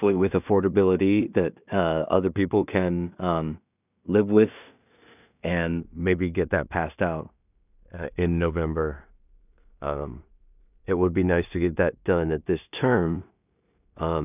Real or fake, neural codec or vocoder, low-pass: fake; codec, 16 kHz in and 24 kHz out, 0.4 kbps, LongCat-Audio-Codec, two codebook decoder; 3.6 kHz